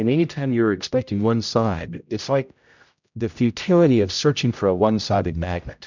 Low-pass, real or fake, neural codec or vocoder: 7.2 kHz; fake; codec, 16 kHz, 0.5 kbps, X-Codec, HuBERT features, trained on general audio